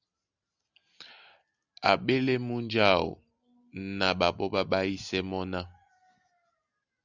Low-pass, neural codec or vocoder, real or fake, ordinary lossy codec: 7.2 kHz; none; real; Opus, 64 kbps